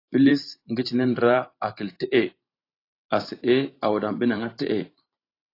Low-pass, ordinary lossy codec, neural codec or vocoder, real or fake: 5.4 kHz; AAC, 32 kbps; vocoder, 44.1 kHz, 128 mel bands every 512 samples, BigVGAN v2; fake